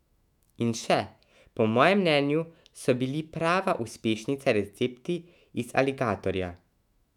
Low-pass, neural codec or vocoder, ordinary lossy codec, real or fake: 19.8 kHz; autoencoder, 48 kHz, 128 numbers a frame, DAC-VAE, trained on Japanese speech; none; fake